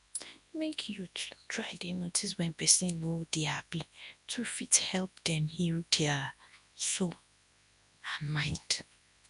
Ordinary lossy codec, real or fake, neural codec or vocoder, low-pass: none; fake; codec, 24 kHz, 0.9 kbps, WavTokenizer, large speech release; 10.8 kHz